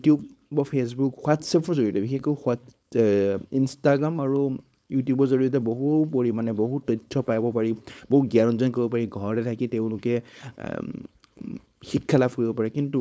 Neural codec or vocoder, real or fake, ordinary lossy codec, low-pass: codec, 16 kHz, 4.8 kbps, FACodec; fake; none; none